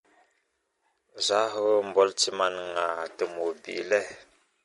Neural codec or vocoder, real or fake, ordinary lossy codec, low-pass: none; real; MP3, 48 kbps; 19.8 kHz